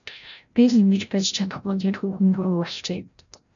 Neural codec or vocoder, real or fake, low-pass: codec, 16 kHz, 0.5 kbps, FreqCodec, larger model; fake; 7.2 kHz